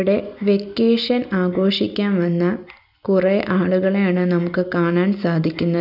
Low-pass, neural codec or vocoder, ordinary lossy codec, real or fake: 5.4 kHz; none; none; real